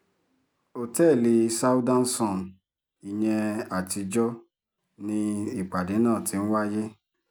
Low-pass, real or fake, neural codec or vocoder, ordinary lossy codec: none; real; none; none